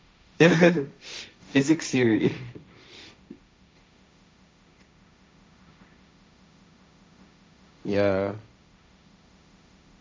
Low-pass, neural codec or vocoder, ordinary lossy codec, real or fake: none; codec, 16 kHz, 1.1 kbps, Voila-Tokenizer; none; fake